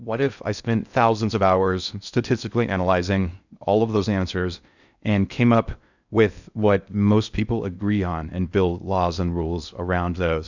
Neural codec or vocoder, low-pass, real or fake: codec, 16 kHz in and 24 kHz out, 0.6 kbps, FocalCodec, streaming, 2048 codes; 7.2 kHz; fake